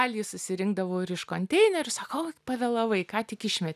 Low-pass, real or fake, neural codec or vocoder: 14.4 kHz; real; none